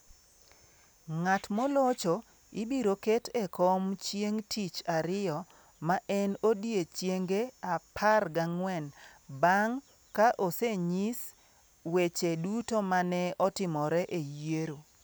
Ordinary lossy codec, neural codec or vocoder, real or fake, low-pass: none; none; real; none